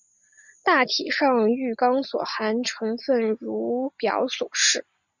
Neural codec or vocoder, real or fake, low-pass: none; real; 7.2 kHz